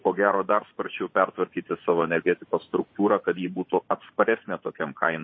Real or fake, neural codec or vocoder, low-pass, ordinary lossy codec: fake; vocoder, 24 kHz, 100 mel bands, Vocos; 7.2 kHz; MP3, 24 kbps